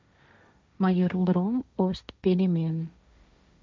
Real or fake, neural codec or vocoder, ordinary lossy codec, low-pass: fake; codec, 16 kHz, 1.1 kbps, Voila-Tokenizer; none; none